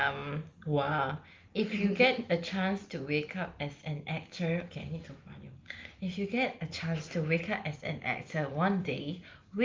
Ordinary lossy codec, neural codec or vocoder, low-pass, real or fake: Opus, 32 kbps; none; 7.2 kHz; real